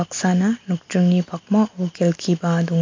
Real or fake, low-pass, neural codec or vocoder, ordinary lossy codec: real; 7.2 kHz; none; MP3, 64 kbps